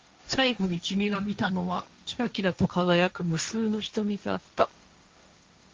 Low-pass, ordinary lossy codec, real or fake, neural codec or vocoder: 7.2 kHz; Opus, 32 kbps; fake; codec, 16 kHz, 1.1 kbps, Voila-Tokenizer